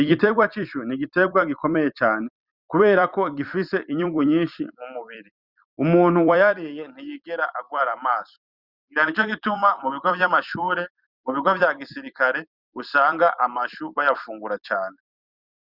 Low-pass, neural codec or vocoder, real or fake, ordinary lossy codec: 5.4 kHz; vocoder, 44.1 kHz, 128 mel bands every 256 samples, BigVGAN v2; fake; Opus, 64 kbps